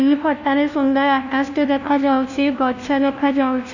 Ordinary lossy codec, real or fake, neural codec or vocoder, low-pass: none; fake; codec, 16 kHz, 1 kbps, FunCodec, trained on LibriTTS, 50 frames a second; 7.2 kHz